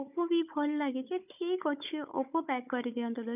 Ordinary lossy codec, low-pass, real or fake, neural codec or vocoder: none; 3.6 kHz; fake; codec, 16 kHz, 16 kbps, FunCodec, trained on Chinese and English, 50 frames a second